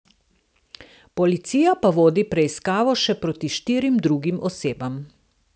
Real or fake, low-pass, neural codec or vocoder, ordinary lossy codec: real; none; none; none